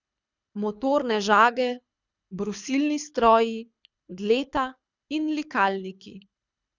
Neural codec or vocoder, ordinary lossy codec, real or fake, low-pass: codec, 24 kHz, 6 kbps, HILCodec; none; fake; 7.2 kHz